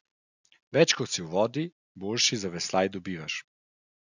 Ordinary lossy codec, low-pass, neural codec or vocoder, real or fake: none; 7.2 kHz; none; real